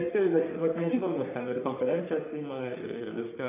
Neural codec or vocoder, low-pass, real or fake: codec, 44.1 kHz, 3.4 kbps, Pupu-Codec; 3.6 kHz; fake